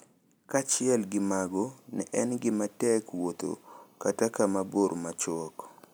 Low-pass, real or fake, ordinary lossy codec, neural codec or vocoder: none; real; none; none